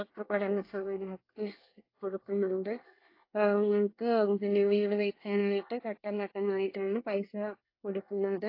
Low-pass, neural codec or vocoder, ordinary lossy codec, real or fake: 5.4 kHz; codec, 24 kHz, 1 kbps, SNAC; AAC, 32 kbps; fake